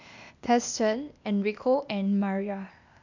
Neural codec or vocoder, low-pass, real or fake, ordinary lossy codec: codec, 16 kHz, 0.8 kbps, ZipCodec; 7.2 kHz; fake; none